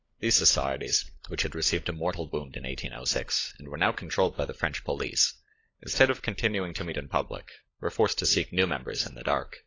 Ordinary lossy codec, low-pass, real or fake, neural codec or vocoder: AAC, 32 kbps; 7.2 kHz; fake; codec, 16 kHz, 16 kbps, FunCodec, trained on LibriTTS, 50 frames a second